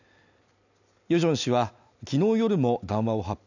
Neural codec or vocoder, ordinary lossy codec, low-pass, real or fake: none; none; 7.2 kHz; real